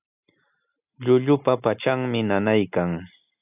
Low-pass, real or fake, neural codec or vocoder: 3.6 kHz; real; none